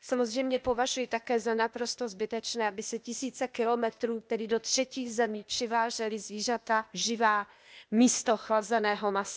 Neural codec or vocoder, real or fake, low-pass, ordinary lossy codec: codec, 16 kHz, 0.8 kbps, ZipCodec; fake; none; none